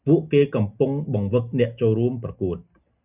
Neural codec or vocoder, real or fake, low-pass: none; real; 3.6 kHz